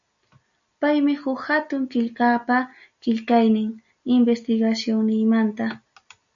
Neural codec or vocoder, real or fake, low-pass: none; real; 7.2 kHz